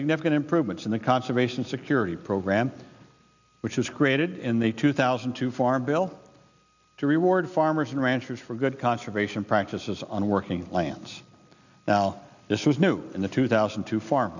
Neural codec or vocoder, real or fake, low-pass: none; real; 7.2 kHz